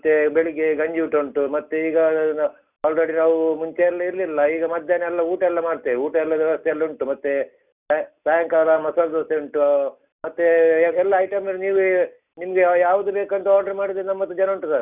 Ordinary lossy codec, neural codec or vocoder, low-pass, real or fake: Opus, 64 kbps; none; 3.6 kHz; real